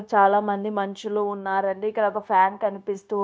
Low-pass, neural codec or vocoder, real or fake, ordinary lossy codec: none; codec, 16 kHz, 0.9 kbps, LongCat-Audio-Codec; fake; none